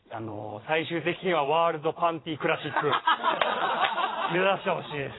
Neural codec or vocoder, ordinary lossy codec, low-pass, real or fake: vocoder, 22.05 kHz, 80 mel bands, Vocos; AAC, 16 kbps; 7.2 kHz; fake